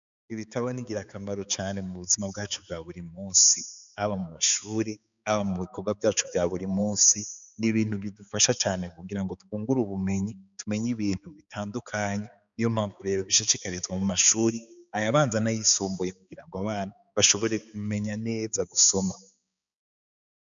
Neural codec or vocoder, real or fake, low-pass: codec, 16 kHz, 4 kbps, X-Codec, HuBERT features, trained on balanced general audio; fake; 7.2 kHz